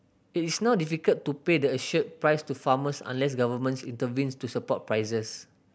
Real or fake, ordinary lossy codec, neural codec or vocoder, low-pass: real; none; none; none